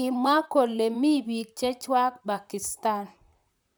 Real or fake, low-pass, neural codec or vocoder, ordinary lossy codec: fake; none; vocoder, 44.1 kHz, 128 mel bands, Pupu-Vocoder; none